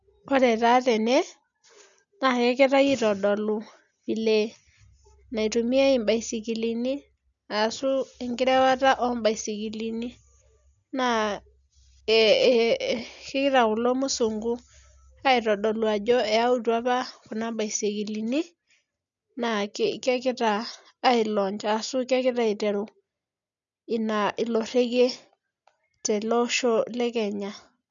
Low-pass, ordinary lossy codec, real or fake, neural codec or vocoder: 7.2 kHz; none; real; none